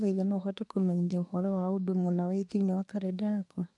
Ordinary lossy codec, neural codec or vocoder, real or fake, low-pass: none; codec, 24 kHz, 1 kbps, SNAC; fake; 10.8 kHz